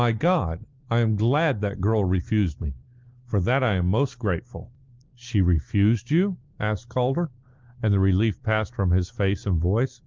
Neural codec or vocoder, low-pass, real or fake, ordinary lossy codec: none; 7.2 kHz; real; Opus, 32 kbps